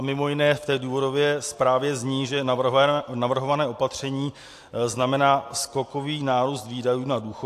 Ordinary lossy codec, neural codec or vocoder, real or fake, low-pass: AAC, 64 kbps; none; real; 14.4 kHz